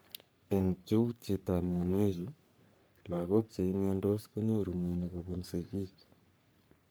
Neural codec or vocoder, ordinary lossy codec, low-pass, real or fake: codec, 44.1 kHz, 3.4 kbps, Pupu-Codec; none; none; fake